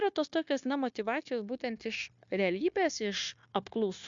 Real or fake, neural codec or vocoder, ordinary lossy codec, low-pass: fake; codec, 16 kHz, 0.9 kbps, LongCat-Audio-Codec; MP3, 64 kbps; 7.2 kHz